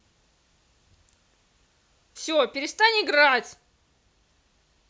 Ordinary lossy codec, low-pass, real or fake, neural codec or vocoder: none; none; real; none